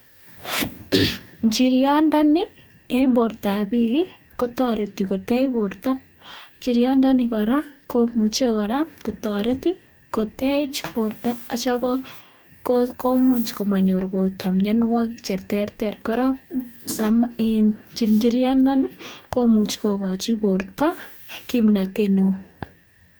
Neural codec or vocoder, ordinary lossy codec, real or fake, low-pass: codec, 44.1 kHz, 2.6 kbps, DAC; none; fake; none